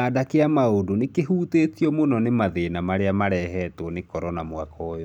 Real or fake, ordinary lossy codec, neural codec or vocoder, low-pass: real; none; none; 19.8 kHz